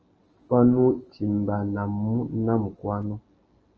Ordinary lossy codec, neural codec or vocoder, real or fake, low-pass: Opus, 24 kbps; none; real; 7.2 kHz